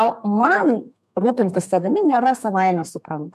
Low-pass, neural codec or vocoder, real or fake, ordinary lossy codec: 14.4 kHz; codec, 32 kHz, 1.9 kbps, SNAC; fake; MP3, 96 kbps